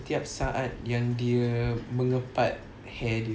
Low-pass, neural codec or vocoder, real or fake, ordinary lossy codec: none; none; real; none